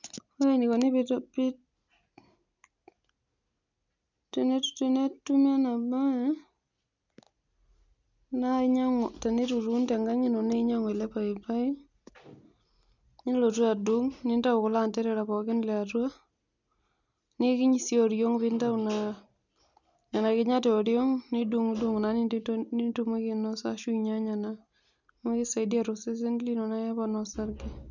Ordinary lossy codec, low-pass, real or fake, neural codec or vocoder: none; 7.2 kHz; real; none